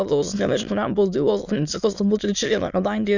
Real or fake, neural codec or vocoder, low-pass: fake; autoencoder, 22.05 kHz, a latent of 192 numbers a frame, VITS, trained on many speakers; 7.2 kHz